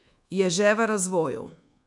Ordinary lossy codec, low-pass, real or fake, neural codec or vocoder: none; 10.8 kHz; fake; codec, 24 kHz, 1.2 kbps, DualCodec